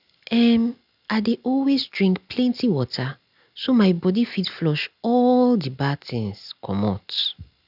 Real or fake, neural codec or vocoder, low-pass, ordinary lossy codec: real; none; 5.4 kHz; none